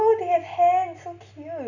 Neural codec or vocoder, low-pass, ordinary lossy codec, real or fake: none; 7.2 kHz; none; real